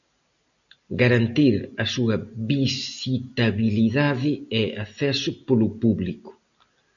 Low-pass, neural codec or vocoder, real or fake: 7.2 kHz; none; real